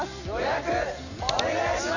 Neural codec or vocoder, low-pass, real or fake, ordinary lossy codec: none; 7.2 kHz; real; none